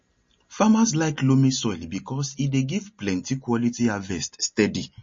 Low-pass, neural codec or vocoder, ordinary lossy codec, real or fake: 7.2 kHz; none; MP3, 32 kbps; real